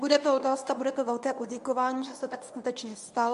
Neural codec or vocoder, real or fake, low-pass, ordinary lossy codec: codec, 24 kHz, 0.9 kbps, WavTokenizer, medium speech release version 1; fake; 10.8 kHz; AAC, 64 kbps